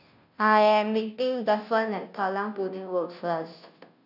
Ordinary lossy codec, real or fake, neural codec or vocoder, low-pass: none; fake; codec, 16 kHz, 0.5 kbps, FunCodec, trained on Chinese and English, 25 frames a second; 5.4 kHz